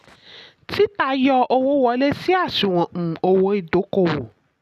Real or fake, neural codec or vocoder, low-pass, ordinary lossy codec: real; none; 14.4 kHz; none